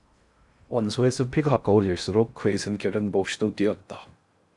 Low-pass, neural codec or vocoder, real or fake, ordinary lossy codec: 10.8 kHz; codec, 16 kHz in and 24 kHz out, 0.6 kbps, FocalCodec, streaming, 4096 codes; fake; Opus, 64 kbps